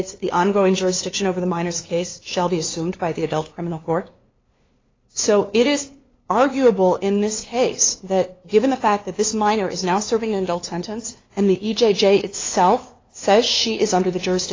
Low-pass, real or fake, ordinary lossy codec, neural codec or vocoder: 7.2 kHz; fake; AAC, 32 kbps; codec, 16 kHz, 2 kbps, FunCodec, trained on LibriTTS, 25 frames a second